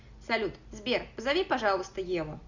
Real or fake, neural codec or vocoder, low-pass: real; none; 7.2 kHz